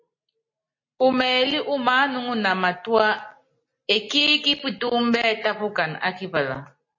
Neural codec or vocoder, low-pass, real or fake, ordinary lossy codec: none; 7.2 kHz; real; MP3, 32 kbps